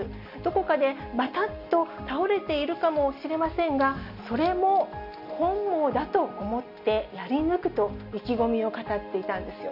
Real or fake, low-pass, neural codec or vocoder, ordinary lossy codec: real; 5.4 kHz; none; none